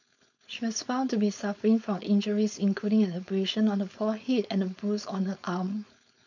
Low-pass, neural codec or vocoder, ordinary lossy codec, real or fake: 7.2 kHz; codec, 16 kHz, 4.8 kbps, FACodec; none; fake